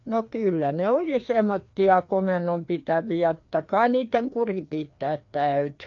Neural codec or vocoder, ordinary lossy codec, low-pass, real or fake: codec, 16 kHz, 2 kbps, FreqCodec, larger model; AAC, 48 kbps; 7.2 kHz; fake